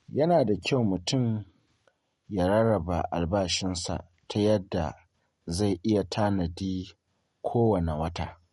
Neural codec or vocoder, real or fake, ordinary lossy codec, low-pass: none; real; MP3, 48 kbps; 19.8 kHz